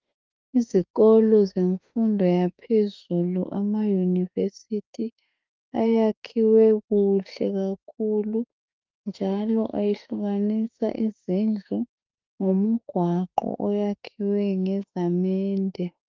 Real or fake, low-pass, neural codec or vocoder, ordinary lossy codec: fake; 7.2 kHz; autoencoder, 48 kHz, 32 numbers a frame, DAC-VAE, trained on Japanese speech; Opus, 32 kbps